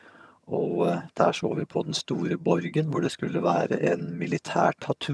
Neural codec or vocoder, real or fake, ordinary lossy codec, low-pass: vocoder, 22.05 kHz, 80 mel bands, HiFi-GAN; fake; none; none